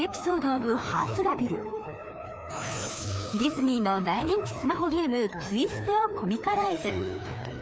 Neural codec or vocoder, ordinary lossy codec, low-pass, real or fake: codec, 16 kHz, 2 kbps, FreqCodec, larger model; none; none; fake